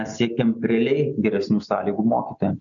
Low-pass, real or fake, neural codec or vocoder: 7.2 kHz; real; none